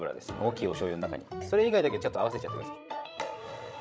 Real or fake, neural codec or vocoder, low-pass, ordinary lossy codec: fake; codec, 16 kHz, 16 kbps, FreqCodec, larger model; none; none